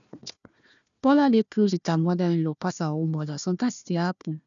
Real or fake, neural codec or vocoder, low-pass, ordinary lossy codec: fake; codec, 16 kHz, 1 kbps, FunCodec, trained on Chinese and English, 50 frames a second; 7.2 kHz; none